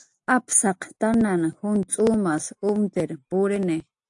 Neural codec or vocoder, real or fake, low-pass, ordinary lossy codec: none; real; 10.8 kHz; AAC, 64 kbps